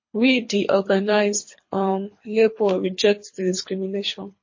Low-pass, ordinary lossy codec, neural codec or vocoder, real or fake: 7.2 kHz; MP3, 32 kbps; codec, 24 kHz, 3 kbps, HILCodec; fake